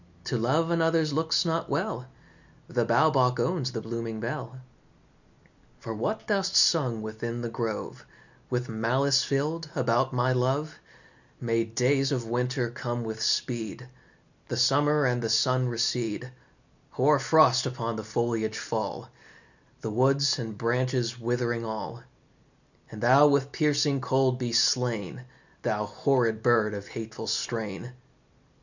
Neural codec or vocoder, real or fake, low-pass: none; real; 7.2 kHz